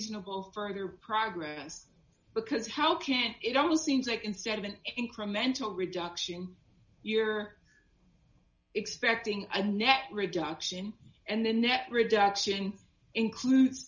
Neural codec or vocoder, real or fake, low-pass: none; real; 7.2 kHz